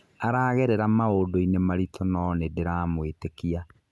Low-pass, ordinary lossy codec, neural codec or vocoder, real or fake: none; none; none; real